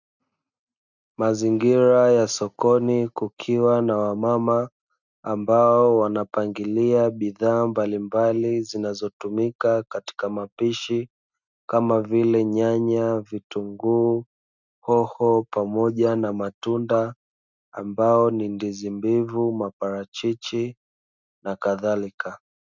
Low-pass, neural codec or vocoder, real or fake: 7.2 kHz; none; real